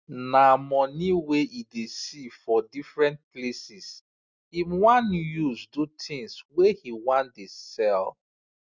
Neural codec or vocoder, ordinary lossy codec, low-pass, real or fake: none; Opus, 64 kbps; 7.2 kHz; real